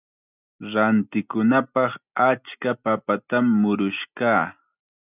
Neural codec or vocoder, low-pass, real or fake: none; 3.6 kHz; real